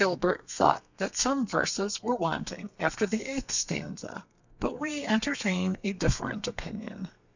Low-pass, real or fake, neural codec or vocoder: 7.2 kHz; fake; codec, 32 kHz, 1.9 kbps, SNAC